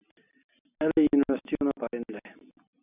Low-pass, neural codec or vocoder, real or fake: 3.6 kHz; none; real